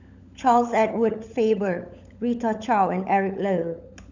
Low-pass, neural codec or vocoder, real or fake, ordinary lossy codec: 7.2 kHz; codec, 16 kHz, 16 kbps, FunCodec, trained on LibriTTS, 50 frames a second; fake; none